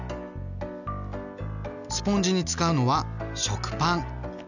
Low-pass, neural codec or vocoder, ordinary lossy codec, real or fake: 7.2 kHz; none; none; real